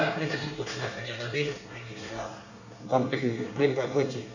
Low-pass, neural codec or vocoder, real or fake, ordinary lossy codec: 7.2 kHz; codec, 24 kHz, 1 kbps, SNAC; fake; none